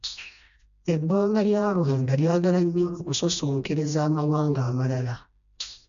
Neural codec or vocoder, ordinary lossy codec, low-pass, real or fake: codec, 16 kHz, 1 kbps, FreqCodec, smaller model; none; 7.2 kHz; fake